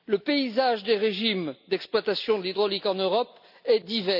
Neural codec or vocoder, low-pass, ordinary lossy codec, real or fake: none; 5.4 kHz; none; real